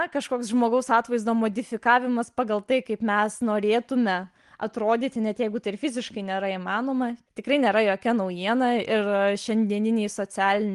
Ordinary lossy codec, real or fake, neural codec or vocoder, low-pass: Opus, 24 kbps; real; none; 10.8 kHz